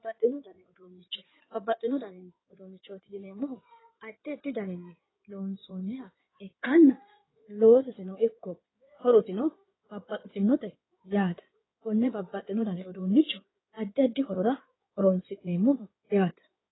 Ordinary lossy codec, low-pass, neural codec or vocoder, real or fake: AAC, 16 kbps; 7.2 kHz; codec, 16 kHz in and 24 kHz out, 2.2 kbps, FireRedTTS-2 codec; fake